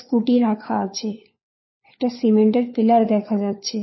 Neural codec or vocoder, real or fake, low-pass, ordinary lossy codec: codec, 16 kHz, 4 kbps, FunCodec, trained on LibriTTS, 50 frames a second; fake; 7.2 kHz; MP3, 24 kbps